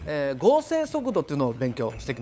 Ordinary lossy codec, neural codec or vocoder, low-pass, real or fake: none; codec, 16 kHz, 8 kbps, FunCodec, trained on LibriTTS, 25 frames a second; none; fake